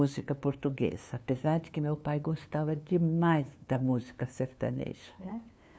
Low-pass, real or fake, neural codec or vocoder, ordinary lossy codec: none; fake; codec, 16 kHz, 2 kbps, FunCodec, trained on LibriTTS, 25 frames a second; none